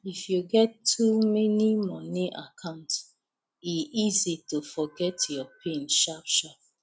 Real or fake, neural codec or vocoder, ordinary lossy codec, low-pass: real; none; none; none